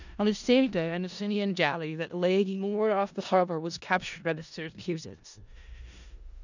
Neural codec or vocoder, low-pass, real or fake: codec, 16 kHz in and 24 kHz out, 0.4 kbps, LongCat-Audio-Codec, four codebook decoder; 7.2 kHz; fake